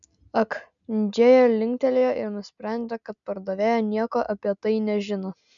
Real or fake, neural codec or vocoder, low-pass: real; none; 7.2 kHz